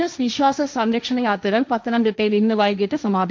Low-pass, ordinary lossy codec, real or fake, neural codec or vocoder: none; none; fake; codec, 16 kHz, 1.1 kbps, Voila-Tokenizer